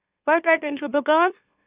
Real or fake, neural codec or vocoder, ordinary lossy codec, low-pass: fake; autoencoder, 44.1 kHz, a latent of 192 numbers a frame, MeloTTS; Opus, 64 kbps; 3.6 kHz